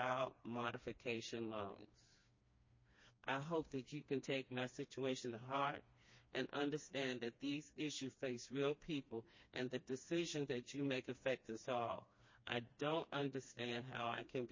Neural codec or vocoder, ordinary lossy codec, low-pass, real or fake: codec, 16 kHz, 2 kbps, FreqCodec, smaller model; MP3, 32 kbps; 7.2 kHz; fake